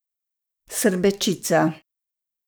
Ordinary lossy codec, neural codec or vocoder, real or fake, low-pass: none; codec, 44.1 kHz, 7.8 kbps, DAC; fake; none